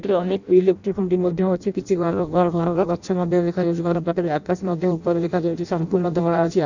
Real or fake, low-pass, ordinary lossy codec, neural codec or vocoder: fake; 7.2 kHz; none; codec, 16 kHz in and 24 kHz out, 0.6 kbps, FireRedTTS-2 codec